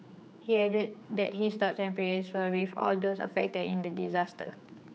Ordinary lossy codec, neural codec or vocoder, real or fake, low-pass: none; codec, 16 kHz, 4 kbps, X-Codec, HuBERT features, trained on general audio; fake; none